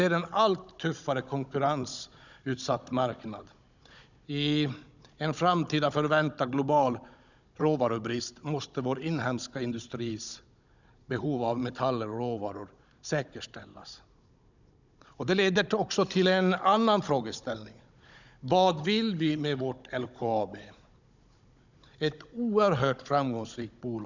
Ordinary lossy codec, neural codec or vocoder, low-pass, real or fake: none; codec, 16 kHz, 16 kbps, FunCodec, trained on Chinese and English, 50 frames a second; 7.2 kHz; fake